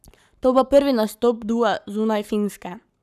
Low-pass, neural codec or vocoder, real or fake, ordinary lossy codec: 14.4 kHz; codec, 44.1 kHz, 7.8 kbps, Pupu-Codec; fake; none